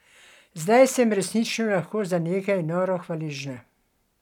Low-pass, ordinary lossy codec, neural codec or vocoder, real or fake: 19.8 kHz; none; none; real